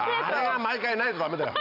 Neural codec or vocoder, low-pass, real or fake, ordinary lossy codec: none; 5.4 kHz; real; none